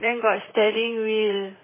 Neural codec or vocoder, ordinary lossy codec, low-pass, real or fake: vocoder, 44.1 kHz, 128 mel bands, Pupu-Vocoder; MP3, 16 kbps; 3.6 kHz; fake